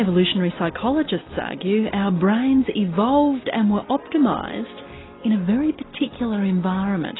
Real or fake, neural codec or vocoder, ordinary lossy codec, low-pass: real; none; AAC, 16 kbps; 7.2 kHz